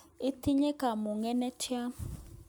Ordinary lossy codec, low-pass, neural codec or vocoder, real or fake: none; none; none; real